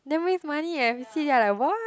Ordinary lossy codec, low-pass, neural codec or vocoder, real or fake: none; none; none; real